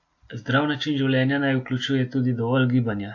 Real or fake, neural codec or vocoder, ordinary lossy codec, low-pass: real; none; AAC, 48 kbps; 7.2 kHz